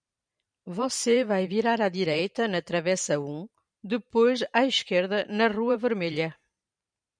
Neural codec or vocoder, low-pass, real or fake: vocoder, 44.1 kHz, 128 mel bands every 512 samples, BigVGAN v2; 9.9 kHz; fake